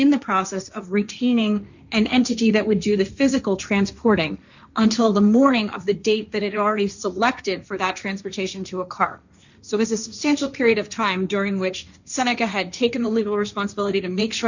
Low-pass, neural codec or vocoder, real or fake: 7.2 kHz; codec, 16 kHz, 1.1 kbps, Voila-Tokenizer; fake